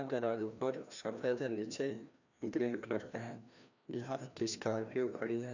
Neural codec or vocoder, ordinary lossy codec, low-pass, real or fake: codec, 16 kHz, 1 kbps, FreqCodec, larger model; none; 7.2 kHz; fake